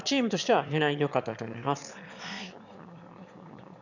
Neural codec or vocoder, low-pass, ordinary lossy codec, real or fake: autoencoder, 22.05 kHz, a latent of 192 numbers a frame, VITS, trained on one speaker; 7.2 kHz; none; fake